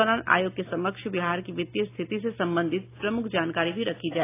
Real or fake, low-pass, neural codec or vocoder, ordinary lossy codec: real; 3.6 kHz; none; AAC, 24 kbps